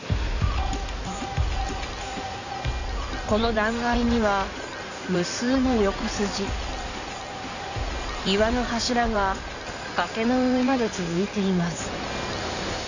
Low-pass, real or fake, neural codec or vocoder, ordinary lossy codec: 7.2 kHz; fake; codec, 16 kHz in and 24 kHz out, 2.2 kbps, FireRedTTS-2 codec; none